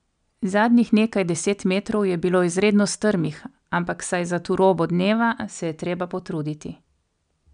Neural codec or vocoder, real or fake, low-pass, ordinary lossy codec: none; real; 9.9 kHz; MP3, 96 kbps